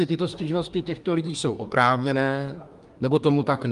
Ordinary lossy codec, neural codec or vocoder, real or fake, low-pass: Opus, 32 kbps; codec, 24 kHz, 1 kbps, SNAC; fake; 10.8 kHz